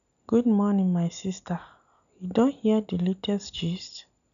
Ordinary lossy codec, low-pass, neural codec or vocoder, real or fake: none; 7.2 kHz; none; real